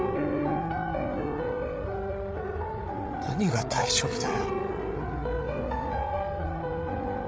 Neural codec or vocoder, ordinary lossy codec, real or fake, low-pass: codec, 16 kHz, 16 kbps, FreqCodec, larger model; none; fake; none